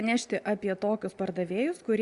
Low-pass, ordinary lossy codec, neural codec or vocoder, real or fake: 10.8 kHz; Opus, 64 kbps; none; real